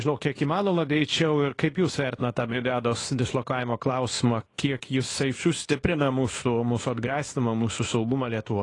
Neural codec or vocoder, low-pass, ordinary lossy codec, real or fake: codec, 24 kHz, 0.9 kbps, WavTokenizer, small release; 10.8 kHz; AAC, 32 kbps; fake